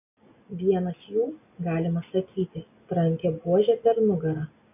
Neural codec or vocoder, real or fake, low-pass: none; real; 3.6 kHz